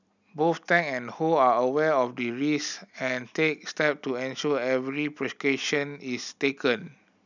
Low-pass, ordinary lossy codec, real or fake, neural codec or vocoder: 7.2 kHz; none; real; none